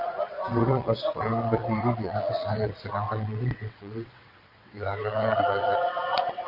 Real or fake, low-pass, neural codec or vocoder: fake; 5.4 kHz; vocoder, 22.05 kHz, 80 mel bands, WaveNeXt